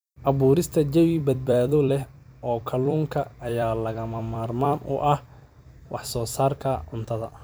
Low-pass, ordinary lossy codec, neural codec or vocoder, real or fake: none; none; vocoder, 44.1 kHz, 128 mel bands every 256 samples, BigVGAN v2; fake